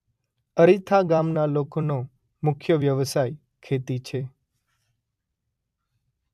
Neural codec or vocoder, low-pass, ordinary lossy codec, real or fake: vocoder, 44.1 kHz, 128 mel bands every 256 samples, BigVGAN v2; 14.4 kHz; AAC, 96 kbps; fake